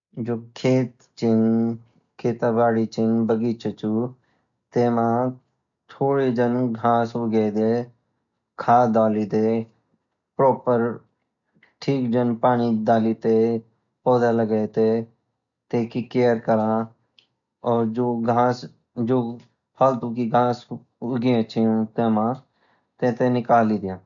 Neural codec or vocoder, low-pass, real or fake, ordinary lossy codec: none; 7.2 kHz; real; none